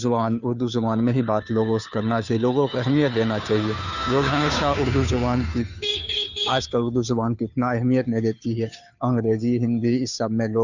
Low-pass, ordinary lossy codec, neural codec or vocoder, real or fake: 7.2 kHz; none; codec, 16 kHz, 2 kbps, FunCodec, trained on Chinese and English, 25 frames a second; fake